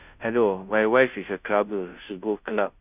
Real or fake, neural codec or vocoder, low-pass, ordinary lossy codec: fake; codec, 16 kHz, 0.5 kbps, FunCodec, trained on Chinese and English, 25 frames a second; 3.6 kHz; none